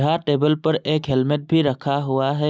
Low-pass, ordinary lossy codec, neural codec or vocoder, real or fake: none; none; none; real